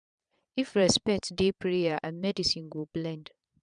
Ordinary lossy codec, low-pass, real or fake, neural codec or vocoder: none; 9.9 kHz; fake; vocoder, 22.05 kHz, 80 mel bands, Vocos